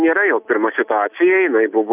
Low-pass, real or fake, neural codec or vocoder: 3.6 kHz; real; none